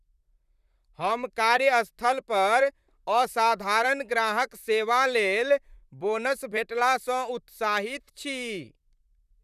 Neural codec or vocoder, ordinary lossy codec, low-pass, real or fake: vocoder, 44.1 kHz, 128 mel bands, Pupu-Vocoder; none; 14.4 kHz; fake